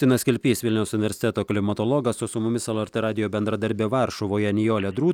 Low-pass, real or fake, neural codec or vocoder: 19.8 kHz; real; none